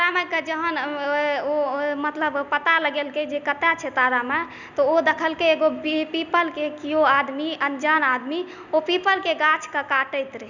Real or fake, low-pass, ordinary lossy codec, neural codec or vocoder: real; 7.2 kHz; none; none